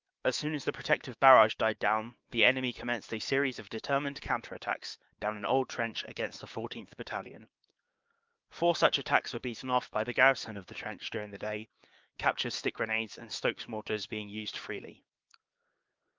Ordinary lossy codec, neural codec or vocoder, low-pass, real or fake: Opus, 24 kbps; codec, 16 kHz, 6 kbps, DAC; 7.2 kHz; fake